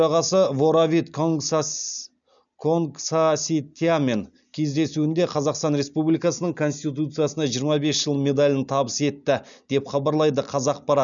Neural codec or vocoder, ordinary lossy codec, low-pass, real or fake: none; none; 7.2 kHz; real